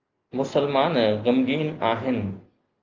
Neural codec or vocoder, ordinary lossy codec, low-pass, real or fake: none; Opus, 32 kbps; 7.2 kHz; real